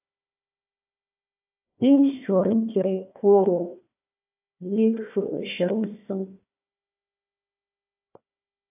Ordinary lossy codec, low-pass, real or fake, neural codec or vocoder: AAC, 32 kbps; 3.6 kHz; fake; codec, 16 kHz, 1 kbps, FunCodec, trained on Chinese and English, 50 frames a second